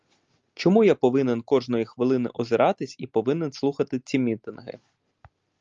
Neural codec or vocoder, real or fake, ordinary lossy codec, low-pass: none; real; Opus, 32 kbps; 7.2 kHz